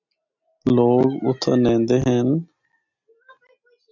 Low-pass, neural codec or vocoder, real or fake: 7.2 kHz; none; real